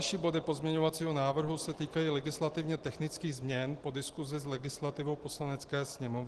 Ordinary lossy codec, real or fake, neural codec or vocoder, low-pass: Opus, 16 kbps; real; none; 10.8 kHz